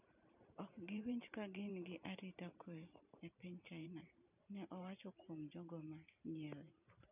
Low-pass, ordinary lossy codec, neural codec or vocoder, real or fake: 3.6 kHz; none; vocoder, 44.1 kHz, 128 mel bands every 512 samples, BigVGAN v2; fake